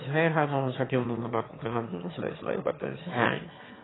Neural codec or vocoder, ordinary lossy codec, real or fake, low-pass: autoencoder, 22.05 kHz, a latent of 192 numbers a frame, VITS, trained on one speaker; AAC, 16 kbps; fake; 7.2 kHz